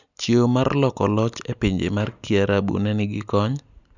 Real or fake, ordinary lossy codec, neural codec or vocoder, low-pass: real; none; none; 7.2 kHz